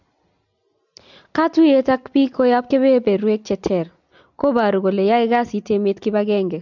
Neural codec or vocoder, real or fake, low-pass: none; real; 7.2 kHz